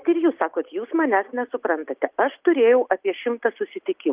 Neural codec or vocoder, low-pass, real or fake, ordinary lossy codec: none; 3.6 kHz; real; Opus, 24 kbps